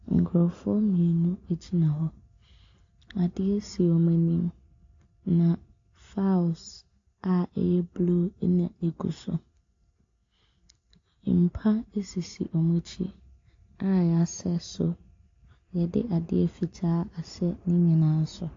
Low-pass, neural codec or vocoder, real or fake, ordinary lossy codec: 7.2 kHz; none; real; AAC, 48 kbps